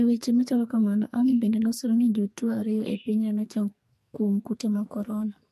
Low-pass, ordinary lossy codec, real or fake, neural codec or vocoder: 14.4 kHz; MP3, 64 kbps; fake; codec, 44.1 kHz, 2.6 kbps, SNAC